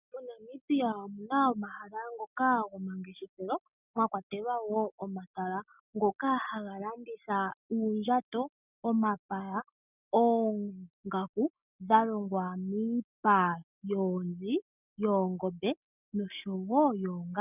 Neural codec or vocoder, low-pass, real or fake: none; 3.6 kHz; real